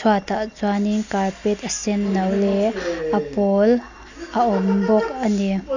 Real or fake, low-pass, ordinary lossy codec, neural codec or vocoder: real; 7.2 kHz; none; none